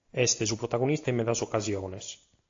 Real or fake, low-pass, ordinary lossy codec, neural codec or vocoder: real; 7.2 kHz; AAC, 48 kbps; none